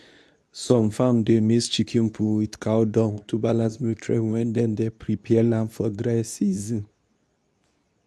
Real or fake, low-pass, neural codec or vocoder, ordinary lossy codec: fake; none; codec, 24 kHz, 0.9 kbps, WavTokenizer, medium speech release version 2; none